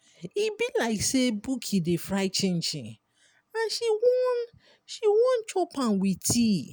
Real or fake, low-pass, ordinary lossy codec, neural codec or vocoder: real; none; none; none